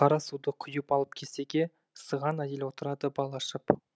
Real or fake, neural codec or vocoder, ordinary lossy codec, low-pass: real; none; none; none